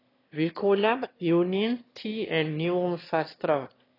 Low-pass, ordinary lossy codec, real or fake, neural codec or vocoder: 5.4 kHz; AAC, 24 kbps; fake; autoencoder, 22.05 kHz, a latent of 192 numbers a frame, VITS, trained on one speaker